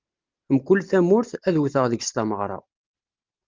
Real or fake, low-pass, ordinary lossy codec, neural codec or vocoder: real; 7.2 kHz; Opus, 24 kbps; none